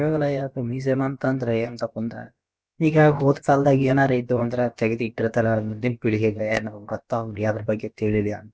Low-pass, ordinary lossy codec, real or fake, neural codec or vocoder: none; none; fake; codec, 16 kHz, about 1 kbps, DyCAST, with the encoder's durations